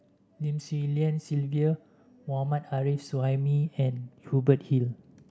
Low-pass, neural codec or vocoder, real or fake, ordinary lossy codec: none; none; real; none